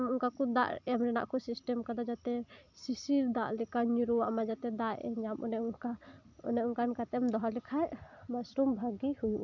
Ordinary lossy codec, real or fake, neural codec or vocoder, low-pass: none; real; none; 7.2 kHz